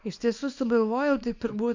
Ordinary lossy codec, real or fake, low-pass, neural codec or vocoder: MP3, 48 kbps; fake; 7.2 kHz; codec, 24 kHz, 0.9 kbps, WavTokenizer, small release